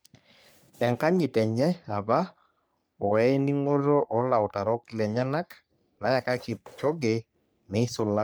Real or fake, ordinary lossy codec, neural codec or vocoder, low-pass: fake; none; codec, 44.1 kHz, 3.4 kbps, Pupu-Codec; none